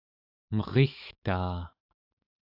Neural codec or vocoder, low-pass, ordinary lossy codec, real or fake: autoencoder, 48 kHz, 128 numbers a frame, DAC-VAE, trained on Japanese speech; 5.4 kHz; AAC, 48 kbps; fake